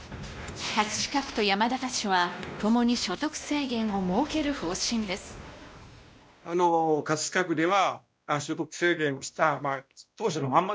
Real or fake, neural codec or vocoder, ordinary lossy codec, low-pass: fake; codec, 16 kHz, 1 kbps, X-Codec, WavLM features, trained on Multilingual LibriSpeech; none; none